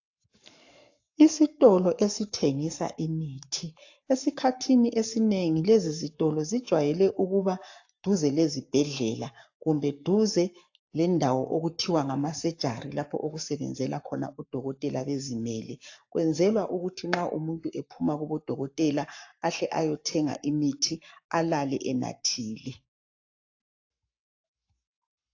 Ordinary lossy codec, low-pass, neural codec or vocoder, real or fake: AAC, 48 kbps; 7.2 kHz; codec, 44.1 kHz, 7.8 kbps, Pupu-Codec; fake